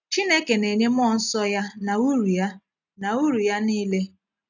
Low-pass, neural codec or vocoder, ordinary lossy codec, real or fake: 7.2 kHz; none; none; real